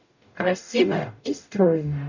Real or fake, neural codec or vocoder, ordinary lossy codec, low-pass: fake; codec, 44.1 kHz, 0.9 kbps, DAC; none; 7.2 kHz